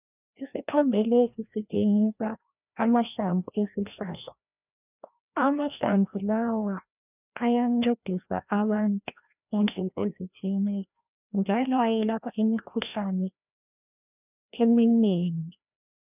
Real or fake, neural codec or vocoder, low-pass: fake; codec, 16 kHz, 1 kbps, FreqCodec, larger model; 3.6 kHz